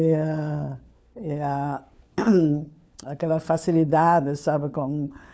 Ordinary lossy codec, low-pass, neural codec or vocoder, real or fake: none; none; codec, 16 kHz, 4 kbps, FunCodec, trained on LibriTTS, 50 frames a second; fake